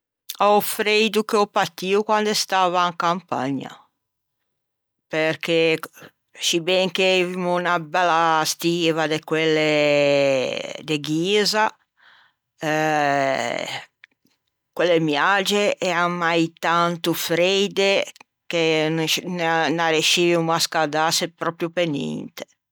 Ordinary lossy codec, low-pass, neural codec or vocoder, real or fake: none; none; none; real